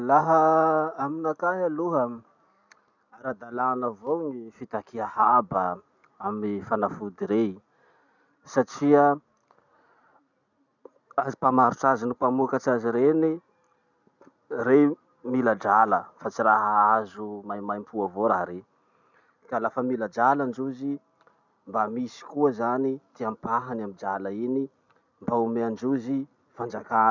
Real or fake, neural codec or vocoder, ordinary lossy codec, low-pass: real; none; none; 7.2 kHz